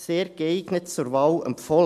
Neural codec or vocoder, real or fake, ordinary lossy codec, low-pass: none; real; AAC, 96 kbps; 14.4 kHz